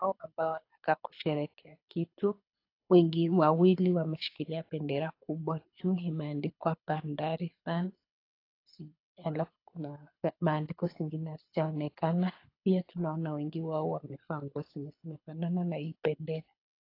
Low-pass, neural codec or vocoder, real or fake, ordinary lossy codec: 5.4 kHz; codec, 24 kHz, 6 kbps, HILCodec; fake; AAC, 32 kbps